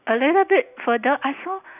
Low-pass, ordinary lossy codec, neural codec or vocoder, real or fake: 3.6 kHz; none; none; real